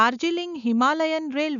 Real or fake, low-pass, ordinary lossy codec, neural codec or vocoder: real; 7.2 kHz; none; none